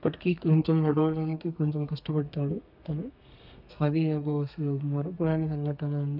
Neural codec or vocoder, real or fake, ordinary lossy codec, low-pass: codec, 32 kHz, 1.9 kbps, SNAC; fake; none; 5.4 kHz